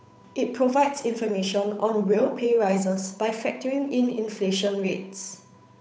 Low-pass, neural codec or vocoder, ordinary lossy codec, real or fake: none; codec, 16 kHz, 8 kbps, FunCodec, trained on Chinese and English, 25 frames a second; none; fake